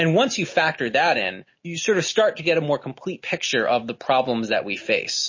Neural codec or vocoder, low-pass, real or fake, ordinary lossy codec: none; 7.2 kHz; real; MP3, 32 kbps